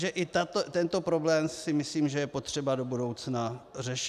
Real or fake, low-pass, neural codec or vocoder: real; 14.4 kHz; none